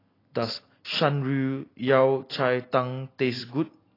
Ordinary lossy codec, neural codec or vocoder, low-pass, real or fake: AAC, 24 kbps; none; 5.4 kHz; real